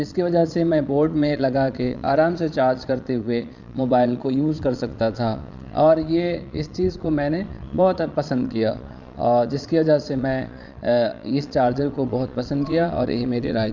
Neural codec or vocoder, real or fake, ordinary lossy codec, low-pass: vocoder, 22.05 kHz, 80 mel bands, Vocos; fake; none; 7.2 kHz